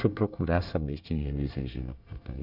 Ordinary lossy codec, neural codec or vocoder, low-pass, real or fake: none; codec, 24 kHz, 1 kbps, SNAC; 5.4 kHz; fake